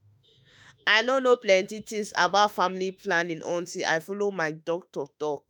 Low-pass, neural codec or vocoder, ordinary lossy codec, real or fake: none; autoencoder, 48 kHz, 32 numbers a frame, DAC-VAE, trained on Japanese speech; none; fake